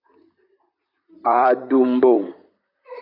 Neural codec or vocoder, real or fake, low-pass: vocoder, 44.1 kHz, 128 mel bands, Pupu-Vocoder; fake; 5.4 kHz